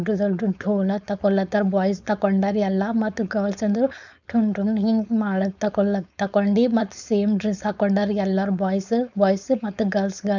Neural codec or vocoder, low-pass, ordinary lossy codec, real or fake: codec, 16 kHz, 4.8 kbps, FACodec; 7.2 kHz; none; fake